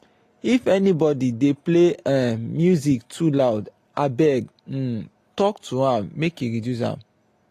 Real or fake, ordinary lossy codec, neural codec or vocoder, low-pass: real; AAC, 48 kbps; none; 14.4 kHz